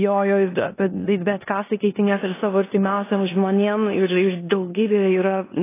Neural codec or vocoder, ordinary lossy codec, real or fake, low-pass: codec, 16 kHz in and 24 kHz out, 0.9 kbps, LongCat-Audio-Codec, four codebook decoder; AAC, 16 kbps; fake; 3.6 kHz